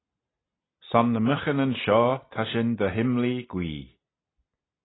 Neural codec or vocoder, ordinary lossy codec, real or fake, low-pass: none; AAC, 16 kbps; real; 7.2 kHz